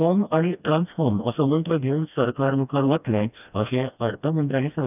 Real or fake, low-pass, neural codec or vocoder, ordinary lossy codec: fake; 3.6 kHz; codec, 16 kHz, 1 kbps, FreqCodec, smaller model; none